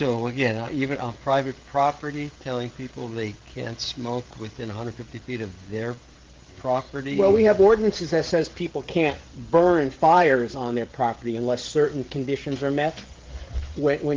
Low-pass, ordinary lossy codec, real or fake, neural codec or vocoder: 7.2 kHz; Opus, 16 kbps; fake; codec, 16 kHz, 16 kbps, FreqCodec, smaller model